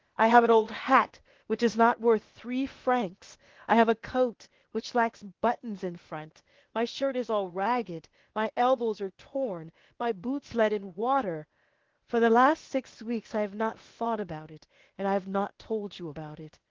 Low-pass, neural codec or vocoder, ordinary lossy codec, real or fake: 7.2 kHz; codec, 16 kHz, 0.8 kbps, ZipCodec; Opus, 16 kbps; fake